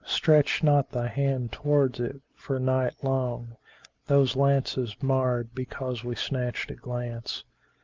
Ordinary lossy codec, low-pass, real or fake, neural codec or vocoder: Opus, 24 kbps; 7.2 kHz; real; none